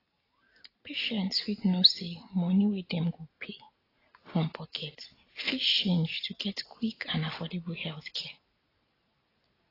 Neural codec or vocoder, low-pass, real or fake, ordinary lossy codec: none; 5.4 kHz; real; AAC, 24 kbps